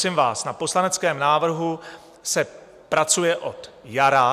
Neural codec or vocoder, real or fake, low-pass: none; real; 14.4 kHz